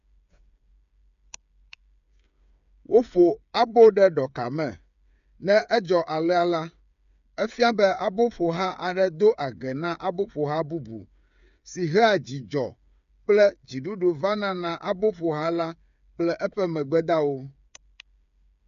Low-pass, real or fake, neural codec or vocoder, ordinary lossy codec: 7.2 kHz; fake; codec, 16 kHz, 8 kbps, FreqCodec, smaller model; none